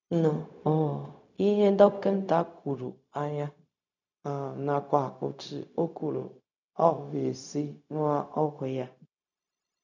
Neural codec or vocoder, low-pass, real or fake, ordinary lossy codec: codec, 16 kHz, 0.4 kbps, LongCat-Audio-Codec; 7.2 kHz; fake; none